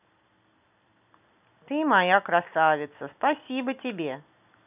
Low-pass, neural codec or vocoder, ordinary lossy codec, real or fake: 3.6 kHz; none; none; real